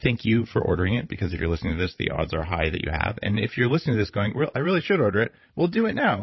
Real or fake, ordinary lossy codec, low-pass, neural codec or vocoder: fake; MP3, 24 kbps; 7.2 kHz; codec, 16 kHz, 16 kbps, FreqCodec, larger model